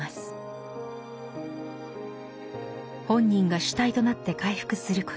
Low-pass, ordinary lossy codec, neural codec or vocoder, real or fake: none; none; none; real